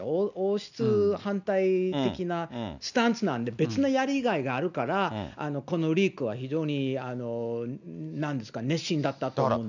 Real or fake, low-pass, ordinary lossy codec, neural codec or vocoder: real; 7.2 kHz; none; none